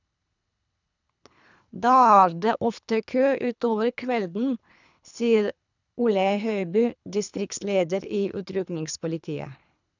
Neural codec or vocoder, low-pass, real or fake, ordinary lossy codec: codec, 24 kHz, 3 kbps, HILCodec; 7.2 kHz; fake; none